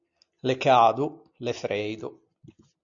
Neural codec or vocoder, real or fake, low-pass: none; real; 7.2 kHz